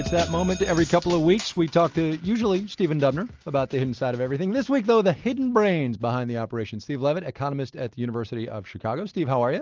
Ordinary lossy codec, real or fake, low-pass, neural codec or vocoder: Opus, 32 kbps; real; 7.2 kHz; none